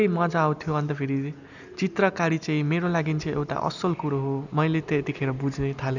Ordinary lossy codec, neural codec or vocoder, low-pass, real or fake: none; none; 7.2 kHz; real